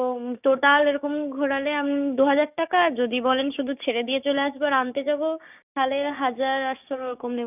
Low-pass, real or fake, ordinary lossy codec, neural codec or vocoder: 3.6 kHz; fake; none; codec, 16 kHz, 6 kbps, DAC